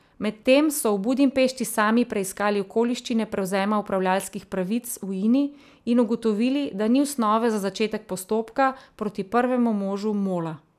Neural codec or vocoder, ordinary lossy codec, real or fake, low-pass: none; none; real; 14.4 kHz